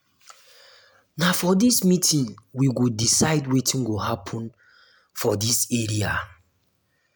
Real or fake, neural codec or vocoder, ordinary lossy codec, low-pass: real; none; none; none